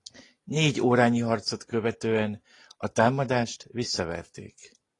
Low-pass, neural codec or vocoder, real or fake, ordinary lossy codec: 10.8 kHz; none; real; AAC, 32 kbps